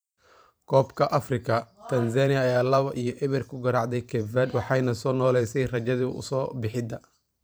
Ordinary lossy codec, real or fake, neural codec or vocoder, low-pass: none; fake; vocoder, 44.1 kHz, 128 mel bands every 512 samples, BigVGAN v2; none